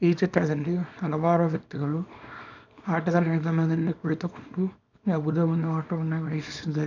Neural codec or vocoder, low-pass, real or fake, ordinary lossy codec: codec, 24 kHz, 0.9 kbps, WavTokenizer, small release; 7.2 kHz; fake; none